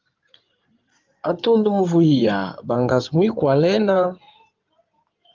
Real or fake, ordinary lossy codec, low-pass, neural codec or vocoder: fake; Opus, 24 kbps; 7.2 kHz; codec, 16 kHz in and 24 kHz out, 2.2 kbps, FireRedTTS-2 codec